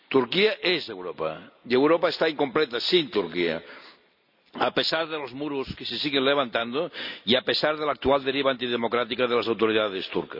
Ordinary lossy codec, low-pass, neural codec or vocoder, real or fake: none; 5.4 kHz; none; real